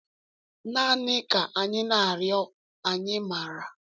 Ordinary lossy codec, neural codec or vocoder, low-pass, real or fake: none; none; none; real